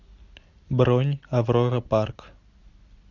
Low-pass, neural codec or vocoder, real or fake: 7.2 kHz; none; real